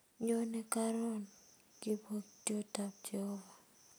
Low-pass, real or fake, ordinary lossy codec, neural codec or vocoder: none; real; none; none